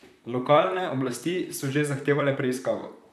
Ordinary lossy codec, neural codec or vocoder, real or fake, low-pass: none; vocoder, 44.1 kHz, 128 mel bands, Pupu-Vocoder; fake; 14.4 kHz